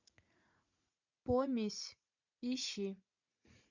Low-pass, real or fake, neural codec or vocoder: 7.2 kHz; fake; vocoder, 44.1 kHz, 80 mel bands, Vocos